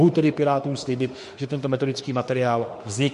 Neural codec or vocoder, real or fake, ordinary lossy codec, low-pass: autoencoder, 48 kHz, 32 numbers a frame, DAC-VAE, trained on Japanese speech; fake; MP3, 48 kbps; 14.4 kHz